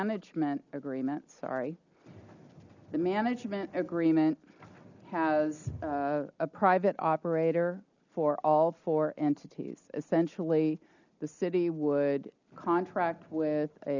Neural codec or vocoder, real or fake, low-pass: none; real; 7.2 kHz